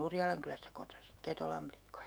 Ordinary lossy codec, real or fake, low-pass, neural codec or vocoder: none; fake; none; autoencoder, 48 kHz, 128 numbers a frame, DAC-VAE, trained on Japanese speech